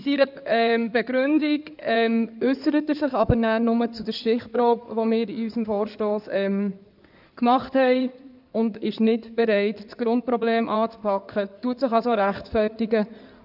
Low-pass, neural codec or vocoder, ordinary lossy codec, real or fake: 5.4 kHz; codec, 16 kHz in and 24 kHz out, 2.2 kbps, FireRedTTS-2 codec; none; fake